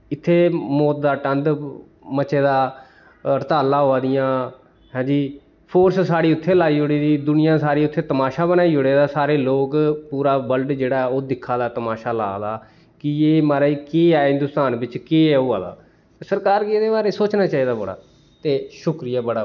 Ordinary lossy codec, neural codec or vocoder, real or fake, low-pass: none; none; real; 7.2 kHz